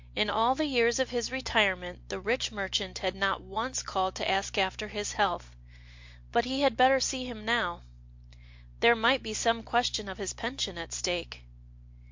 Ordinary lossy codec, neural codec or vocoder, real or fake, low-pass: MP3, 48 kbps; none; real; 7.2 kHz